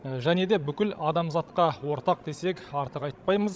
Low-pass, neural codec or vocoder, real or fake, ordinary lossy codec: none; codec, 16 kHz, 16 kbps, FreqCodec, larger model; fake; none